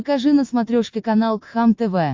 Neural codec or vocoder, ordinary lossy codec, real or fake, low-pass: none; MP3, 48 kbps; real; 7.2 kHz